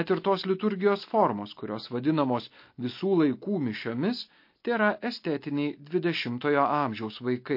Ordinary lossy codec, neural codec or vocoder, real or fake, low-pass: MP3, 32 kbps; none; real; 5.4 kHz